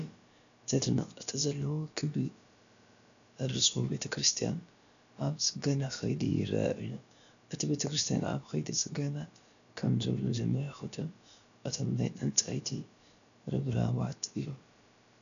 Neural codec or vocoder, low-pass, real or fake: codec, 16 kHz, about 1 kbps, DyCAST, with the encoder's durations; 7.2 kHz; fake